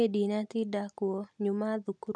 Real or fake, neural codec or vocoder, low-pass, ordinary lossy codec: real; none; none; none